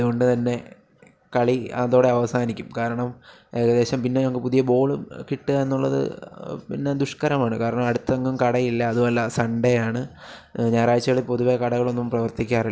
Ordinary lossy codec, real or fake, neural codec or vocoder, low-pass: none; real; none; none